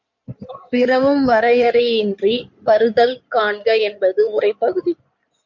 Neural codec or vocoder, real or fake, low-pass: codec, 16 kHz in and 24 kHz out, 2.2 kbps, FireRedTTS-2 codec; fake; 7.2 kHz